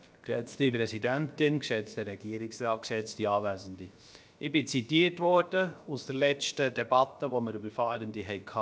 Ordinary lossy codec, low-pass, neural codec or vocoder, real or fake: none; none; codec, 16 kHz, about 1 kbps, DyCAST, with the encoder's durations; fake